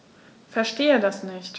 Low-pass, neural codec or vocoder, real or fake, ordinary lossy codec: none; none; real; none